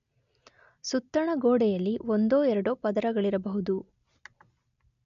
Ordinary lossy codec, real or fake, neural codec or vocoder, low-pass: none; real; none; 7.2 kHz